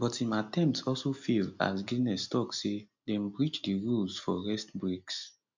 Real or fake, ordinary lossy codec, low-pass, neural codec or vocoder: real; MP3, 64 kbps; 7.2 kHz; none